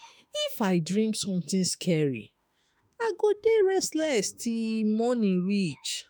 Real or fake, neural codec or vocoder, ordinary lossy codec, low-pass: fake; autoencoder, 48 kHz, 32 numbers a frame, DAC-VAE, trained on Japanese speech; none; none